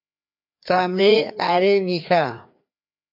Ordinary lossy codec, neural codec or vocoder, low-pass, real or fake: MP3, 48 kbps; codec, 16 kHz, 2 kbps, FreqCodec, larger model; 5.4 kHz; fake